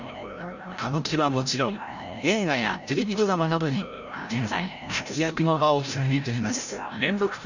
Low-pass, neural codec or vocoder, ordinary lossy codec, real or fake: 7.2 kHz; codec, 16 kHz, 0.5 kbps, FreqCodec, larger model; none; fake